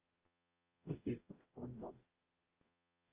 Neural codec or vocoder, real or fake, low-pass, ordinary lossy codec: codec, 44.1 kHz, 0.9 kbps, DAC; fake; 3.6 kHz; Opus, 24 kbps